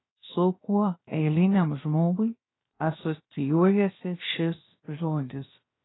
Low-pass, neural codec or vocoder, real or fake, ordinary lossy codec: 7.2 kHz; codec, 16 kHz, 0.7 kbps, FocalCodec; fake; AAC, 16 kbps